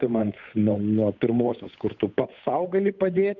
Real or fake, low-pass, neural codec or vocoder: fake; 7.2 kHz; codec, 16 kHz, 8 kbps, FunCodec, trained on Chinese and English, 25 frames a second